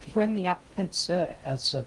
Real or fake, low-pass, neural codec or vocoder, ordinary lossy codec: fake; 10.8 kHz; codec, 16 kHz in and 24 kHz out, 0.6 kbps, FocalCodec, streaming, 2048 codes; Opus, 24 kbps